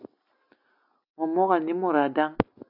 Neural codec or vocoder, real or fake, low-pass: none; real; 5.4 kHz